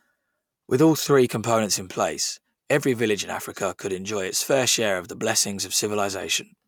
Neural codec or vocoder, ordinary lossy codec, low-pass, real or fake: none; none; none; real